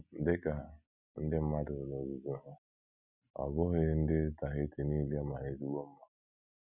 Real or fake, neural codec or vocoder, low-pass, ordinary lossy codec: real; none; 3.6 kHz; none